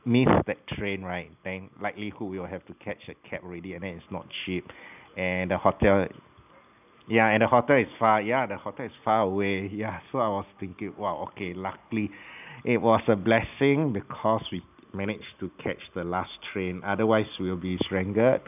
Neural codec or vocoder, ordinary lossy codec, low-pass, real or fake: none; none; 3.6 kHz; real